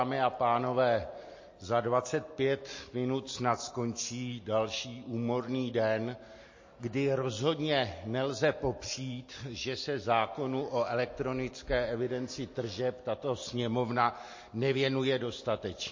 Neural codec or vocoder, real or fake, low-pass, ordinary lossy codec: none; real; 7.2 kHz; MP3, 32 kbps